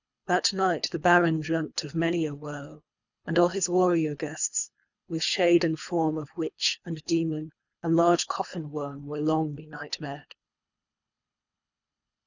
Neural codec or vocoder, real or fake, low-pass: codec, 24 kHz, 3 kbps, HILCodec; fake; 7.2 kHz